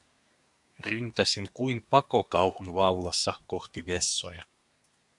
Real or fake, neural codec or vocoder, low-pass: fake; codec, 24 kHz, 1 kbps, SNAC; 10.8 kHz